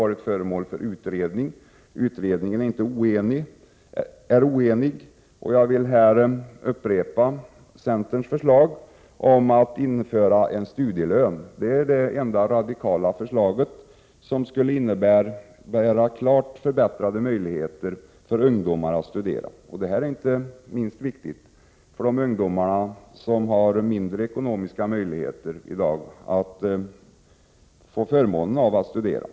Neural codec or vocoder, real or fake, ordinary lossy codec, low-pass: none; real; none; none